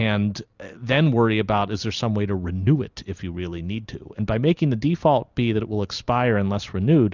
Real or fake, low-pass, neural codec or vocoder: real; 7.2 kHz; none